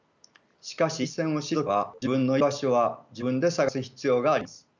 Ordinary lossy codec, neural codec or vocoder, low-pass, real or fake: none; none; 7.2 kHz; real